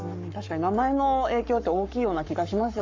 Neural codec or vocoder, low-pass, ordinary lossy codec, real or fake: codec, 44.1 kHz, 7.8 kbps, Pupu-Codec; 7.2 kHz; MP3, 64 kbps; fake